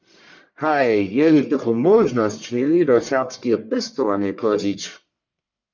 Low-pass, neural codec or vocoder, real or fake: 7.2 kHz; codec, 44.1 kHz, 1.7 kbps, Pupu-Codec; fake